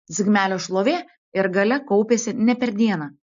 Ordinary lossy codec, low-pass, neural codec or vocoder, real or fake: AAC, 64 kbps; 7.2 kHz; none; real